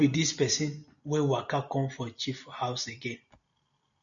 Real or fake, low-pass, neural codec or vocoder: real; 7.2 kHz; none